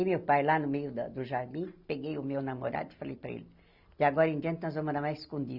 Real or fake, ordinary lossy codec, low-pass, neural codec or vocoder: real; Opus, 64 kbps; 5.4 kHz; none